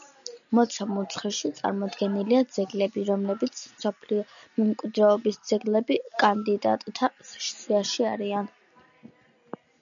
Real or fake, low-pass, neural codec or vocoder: real; 7.2 kHz; none